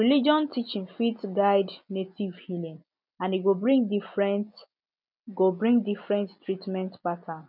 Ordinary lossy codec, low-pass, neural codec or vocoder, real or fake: none; 5.4 kHz; none; real